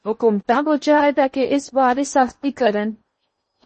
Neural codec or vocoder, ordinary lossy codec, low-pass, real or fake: codec, 16 kHz in and 24 kHz out, 0.8 kbps, FocalCodec, streaming, 65536 codes; MP3, 32 kbps; 10.8 kHz; fake